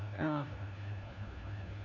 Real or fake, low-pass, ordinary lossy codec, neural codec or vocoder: fake; 7.2 kHz; none; codec, 16 kHz, 1 kbps, FunCodec, trained on LibriTTS, 50 frames a second